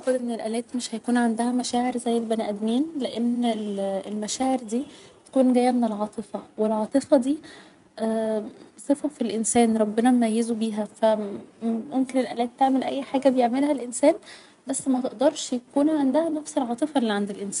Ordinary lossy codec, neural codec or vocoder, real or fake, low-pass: none; vocoder, 24 kHz, 100 mel bands, Vocos; fake; 10.8 kHz